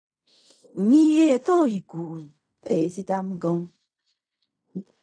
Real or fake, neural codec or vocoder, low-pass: fake; codec, 16 kHz in and 24 kHz out, 0.4 kbps, LongCat-Audio-Codec, fine tuned four codebook decoder; 9.9 kHz